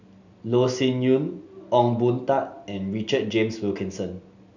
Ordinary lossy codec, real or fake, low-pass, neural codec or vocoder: none; real; 7.2 kHz; none